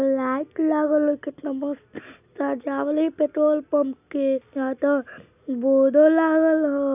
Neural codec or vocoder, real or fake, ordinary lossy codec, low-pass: none; real; AAC, 32 kbps; 3.6 kHz